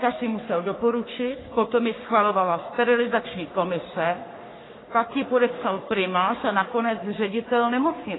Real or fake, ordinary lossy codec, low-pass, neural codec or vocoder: fake; AAC, 16 kbps; 7.2 kHz; codec, 44.1 kHz, 3.4 kbps, Pupu-Codec